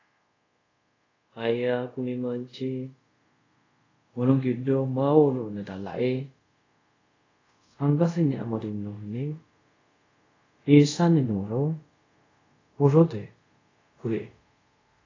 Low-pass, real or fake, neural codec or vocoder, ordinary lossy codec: 7.2 kHz; fake; codec, 24 kHz, 0.5 kbps, DualCodec; AAC, 32 kbps